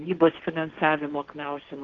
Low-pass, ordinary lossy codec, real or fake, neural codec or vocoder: 7.2 kHz; Opus, 16 kbps; fake; codec, 16 kHz, 1.1 kbps, Voila-Tokenizer